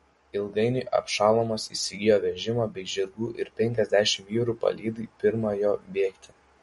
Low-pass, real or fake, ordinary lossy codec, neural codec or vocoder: 19.8 kHz; real; MP3, 48 kbps; none